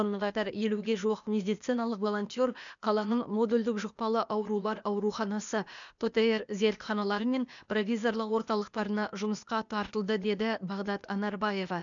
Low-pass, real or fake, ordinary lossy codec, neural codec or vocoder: 7.2 kHz; fake; none; codec, 16 kHz, 0.8 kbps, ZipCodec